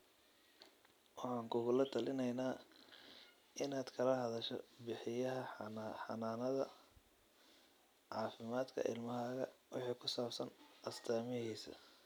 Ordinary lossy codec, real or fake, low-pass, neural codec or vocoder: none; real; none; none